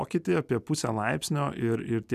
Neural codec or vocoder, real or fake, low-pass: none; real; 14.4 kHz